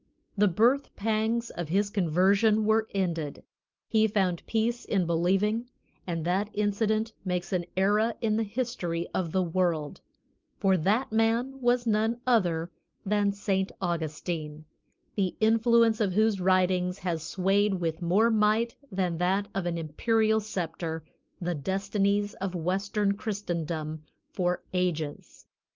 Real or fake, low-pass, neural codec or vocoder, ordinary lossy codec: real; 7.2 kHz; none; Opus, 24 kbps